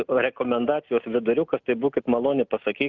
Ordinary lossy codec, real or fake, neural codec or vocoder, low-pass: Opus, 24 kbps; real; none; 7.2 kHz